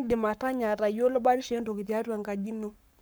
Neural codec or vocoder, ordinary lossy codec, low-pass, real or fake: codec, 44.1 kHz, 7.8 kbps, Pupu-Codec; none; none; fake